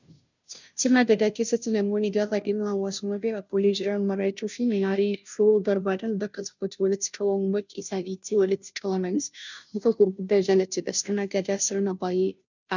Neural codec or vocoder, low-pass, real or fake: codec, 16 kHz, 0.5 kbps, FunCodec, trained on Chinese and English, 25 frames a second; 7.2 kHz; fake